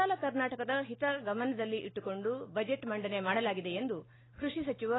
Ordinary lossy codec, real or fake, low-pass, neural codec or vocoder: AAC, 16 kbps; real; 7.2 kHz; none